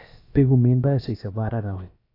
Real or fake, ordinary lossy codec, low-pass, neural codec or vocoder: fake; AAC, 32 kbps; 5.4 kHz; codec, 16 kHz, about 1 kbps, DyCAST, with the encoder's durations